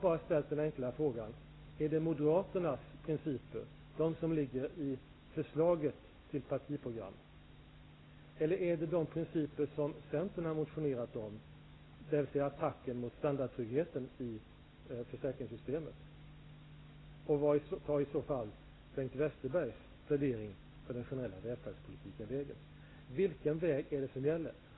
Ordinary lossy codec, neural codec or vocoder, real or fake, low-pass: AAC, 16 kbps; none; real; 7.2 kHz